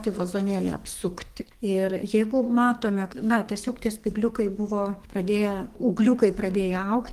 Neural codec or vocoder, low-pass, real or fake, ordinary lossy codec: codec, 44.1 kHz, 2.6 kbps, SNAC; 14.4 kHz; fake; Opus, 24 kbps